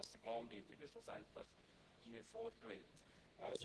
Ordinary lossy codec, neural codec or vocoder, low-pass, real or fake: Opus, 16 kbps; codec, 24 kHz, 0.9 kbps, WavTokenizer, medium music audio release; 10.8 kHz; fake